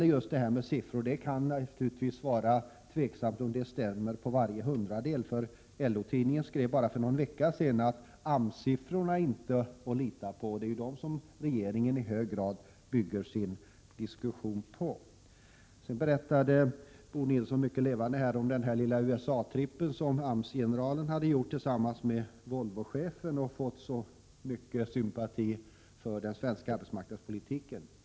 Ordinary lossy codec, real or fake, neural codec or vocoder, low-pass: none; real; none; none